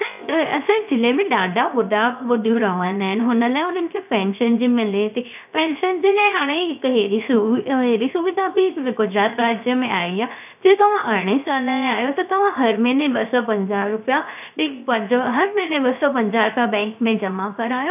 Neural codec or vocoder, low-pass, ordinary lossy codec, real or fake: codec, 16 kHz, 0.7 kbps, FocalCodec; 3.6 kHz; none; fake